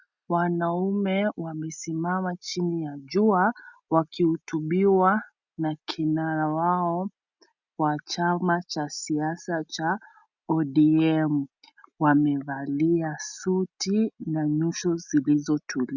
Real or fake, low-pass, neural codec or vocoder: real; 7.2 kHz; none